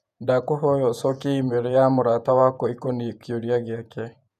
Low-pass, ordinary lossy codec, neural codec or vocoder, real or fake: 14.4 kHz; none; vocoder, 48 kHz, 128 mel bands, Vocos; fake